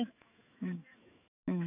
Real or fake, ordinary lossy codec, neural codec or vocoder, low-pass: fake; none; autoencoder, 48 kHz, 128 numbers a frame, DAC-VAE, trained on Japanese speech; 3.6 kHz